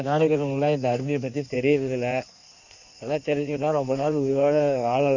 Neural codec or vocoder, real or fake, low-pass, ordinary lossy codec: codec, 16 kHz in and 24 kHz out, 1.1 kbps, FireRedTTS-2 codec; fake; 7.2 kHz; none